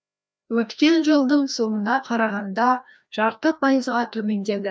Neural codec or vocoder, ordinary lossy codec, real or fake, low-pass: codec, 16 kHz, 1 kbps, FreqCodec, larger model; none; fake; none